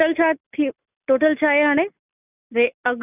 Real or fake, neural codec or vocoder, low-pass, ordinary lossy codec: real; none; 3.6 kHz; none